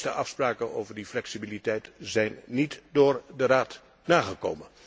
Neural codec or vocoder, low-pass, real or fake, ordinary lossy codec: none; none; real; none